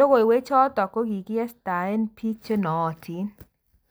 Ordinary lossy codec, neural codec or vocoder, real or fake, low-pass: none; none; real; none